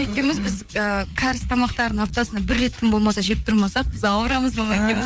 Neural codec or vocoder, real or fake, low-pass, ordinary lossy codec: codec, 16 kHz, 4 kbps, FreqCodec, larger model; fake; none; none